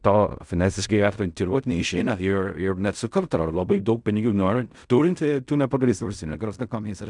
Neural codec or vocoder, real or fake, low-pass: codec, 16 kHz in and 24 kHz out, 0.4 kbps, LongCat-Audio-Codec, fine tuned four codebook decoder; fake; 10.8 kHz